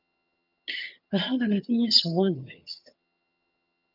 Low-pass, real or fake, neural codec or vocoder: 5.4 kHz; fake; vocoder, 22.05 kHz, 80 mel bands, HiFi-GAN